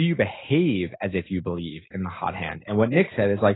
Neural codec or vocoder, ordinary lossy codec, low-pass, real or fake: none; AAC, 16 kbps; 7.2 kHz; real